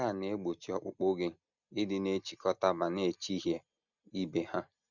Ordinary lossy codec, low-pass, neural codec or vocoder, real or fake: none; 7.2 kHz; none; real